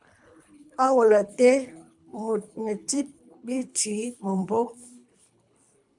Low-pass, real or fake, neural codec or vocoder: 10.8 kHz; fake; codec, 24 kHz, 3 kbps, HILCodec